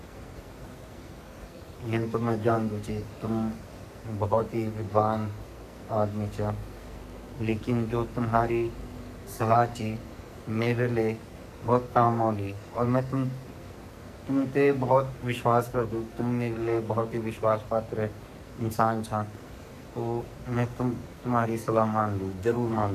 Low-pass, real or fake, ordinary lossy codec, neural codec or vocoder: 14.4 kHz; fake; none; codec, 44.1 kHz, 2.6 kbps, SNAC